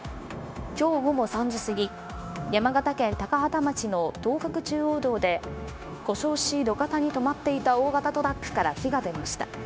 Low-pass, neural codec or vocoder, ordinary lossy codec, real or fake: none; codec, 16 kHz, 0.9 kbps, LongCat-Audio-Codec; none; fake